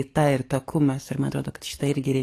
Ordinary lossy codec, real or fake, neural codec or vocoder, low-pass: AAC, 48 kbps; fake; codec, 44.1 kHz, 7.8 kbps, DAC; 14.4 kHz